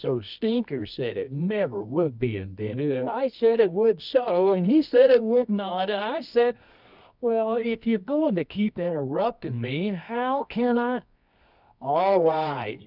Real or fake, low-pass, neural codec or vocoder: fake; 5.4 kHz; codec, 24 kHz, 0.9 kbps, WavTokenizer, medium music audio release